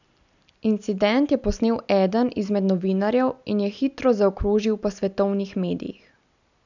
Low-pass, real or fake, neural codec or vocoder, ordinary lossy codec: 7.2 kHz; real; none; none